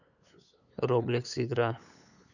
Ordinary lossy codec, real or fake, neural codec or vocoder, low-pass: none; fake; codec, 16 kHz, 8 kbps, FunCodec, trained on LibriTTS, 25 frames a second; 7.2 kHz